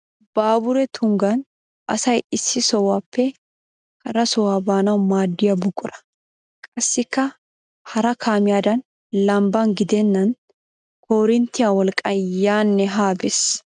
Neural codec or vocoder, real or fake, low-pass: none; real; 9.9 kHz